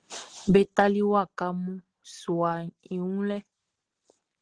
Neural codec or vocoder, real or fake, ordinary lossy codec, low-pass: none; real; Opus, 16 kbps; 9.9 kHz